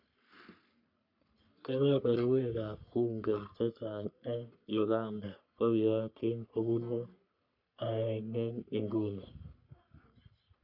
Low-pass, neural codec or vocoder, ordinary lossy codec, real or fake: 5.4 kHz; codec, 44.1 kHz, 3.4 kbps, Pupu-Codec; none; fake